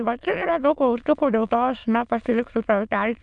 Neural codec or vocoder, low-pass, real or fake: autoencoder, 22.05 kHz, a latent of 192 numbers a frame, VITS, trained on many speakers; 9.9 kHz; fake